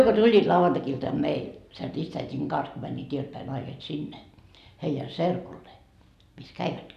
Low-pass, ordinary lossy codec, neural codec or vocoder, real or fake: 14.4 kHz; none; none; real